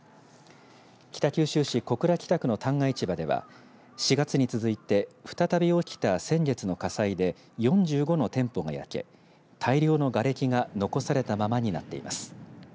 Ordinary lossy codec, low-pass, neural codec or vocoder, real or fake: none; none; none; real